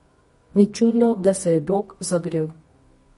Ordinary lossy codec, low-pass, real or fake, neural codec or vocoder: MP3, 48 kbps; 10.8 kHz; fake; codec, 24 kHz, 0.9 kbps, WavTokenizer, medium music audio release